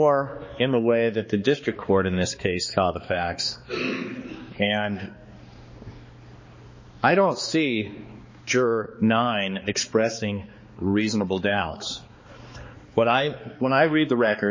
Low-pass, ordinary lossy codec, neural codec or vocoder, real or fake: 7.2 kHz; MP3, 32 kbps; codec, 16 kHz, 2 kbps, X-Codec, HuBERT features, trained on balanced general audio; fake